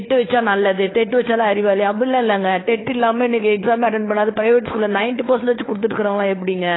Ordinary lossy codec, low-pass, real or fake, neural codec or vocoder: AAC, 16 kbps; 7.2 kHz; fake; codec, 16 kHz, 4 kbps, FunCodec, trained on LibriTTS, 50 frames a second